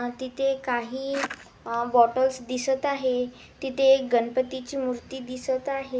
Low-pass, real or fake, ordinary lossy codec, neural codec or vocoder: none; real; none; none